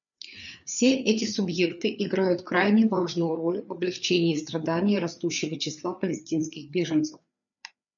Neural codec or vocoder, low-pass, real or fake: codec, 16 kHz, 4 kbps, FreqCodec, larger model; 7.2 kHz; fake